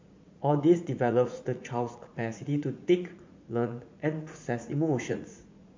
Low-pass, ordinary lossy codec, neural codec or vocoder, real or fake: 7.2 kHz; MP3, 48 kbps; vocoder, 44.1 kHz, 80 mel bands, Vocos; fake